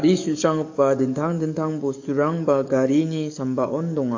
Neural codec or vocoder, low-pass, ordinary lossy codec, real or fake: codec, 44.1 kHz, 7.8 kbps, DAC; 7.2 kHz; AAC, 48 kbps; fake